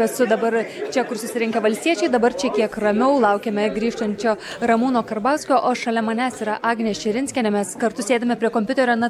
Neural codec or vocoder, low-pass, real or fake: none; 19.8 kHz; real